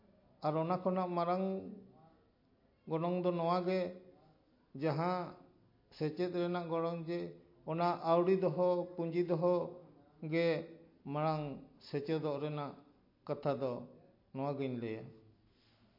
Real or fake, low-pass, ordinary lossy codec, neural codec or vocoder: real; 5.4 kHz; MP3, 32 kbps; none